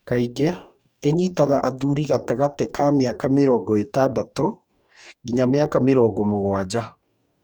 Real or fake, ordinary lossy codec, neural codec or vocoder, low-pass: fake; none; codec, 44.1 kHz, 2.6 kbps, DAC; 19.8 kHz